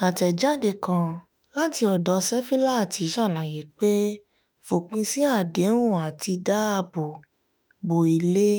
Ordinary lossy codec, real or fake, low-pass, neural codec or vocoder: none; fake; none; autoencoder, 48 kHz, 32 numbers a frame, DAC-VAE, trained on Japanese speech